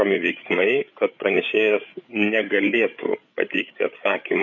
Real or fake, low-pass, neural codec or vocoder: fake; 7.2 kHz; codec, 16 kHz, 8 kbps, FreqCodec, larger model